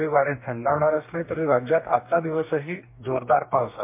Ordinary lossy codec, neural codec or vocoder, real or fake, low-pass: MP3, 24 kbps; codec, 44.1 kHz, 2.6 kbps, DAC; fake; 3.6 kHz